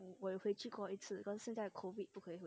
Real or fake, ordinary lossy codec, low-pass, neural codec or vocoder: real; none; none; none